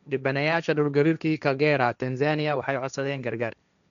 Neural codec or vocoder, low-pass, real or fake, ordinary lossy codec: codec, 16 kHz, 1.1 kbps, Voila-Tokenizer; 7.2 kHz; fake; none